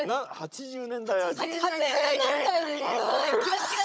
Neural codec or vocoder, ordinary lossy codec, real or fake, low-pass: codec, 16 kHz, 16 kbps, FunCodec, trained on Chinese and English, 50 frames a second; none; fake; none